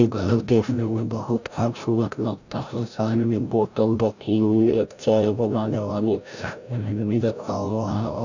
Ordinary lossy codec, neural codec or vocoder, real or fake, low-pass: none; codec, 16 kHz, 0.5 kbps, FreqCodec, larger model; fake; 7.2 kHz